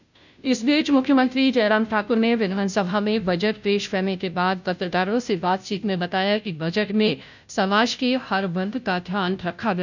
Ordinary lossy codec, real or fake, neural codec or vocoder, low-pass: none; fake; codec, 16 kHz, 0.5 kbps, FunCodec, trained on Chinese and English, 25 frames a second; 7.2 kHz